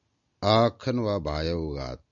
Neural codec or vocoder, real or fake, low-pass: none; real; 7.2 kHz